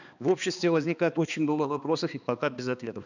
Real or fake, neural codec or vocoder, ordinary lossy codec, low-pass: fake; codec, 16 kHz, 2 kbps, X-Codec, HuBERT features, trained on balanced general audio; none; 7.2 kHz